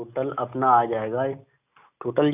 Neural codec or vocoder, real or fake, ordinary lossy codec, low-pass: none; real; none; 3.6 kHz